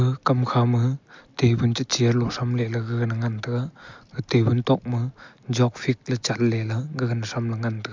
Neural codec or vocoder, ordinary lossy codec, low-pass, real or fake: none; MP3, 64 kbps; 7.2 kHz; real